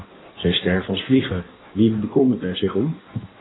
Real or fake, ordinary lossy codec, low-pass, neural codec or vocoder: fake; AAC, 16 kbps; 7.2 kHz; codec, 16 kHz in and 24 kHz out, 1.1 kbps, FireRedTTS-2 codec